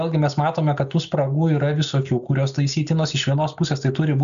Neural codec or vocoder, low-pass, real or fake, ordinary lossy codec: none; 7.2 kHz; real; AAC, 96 kbps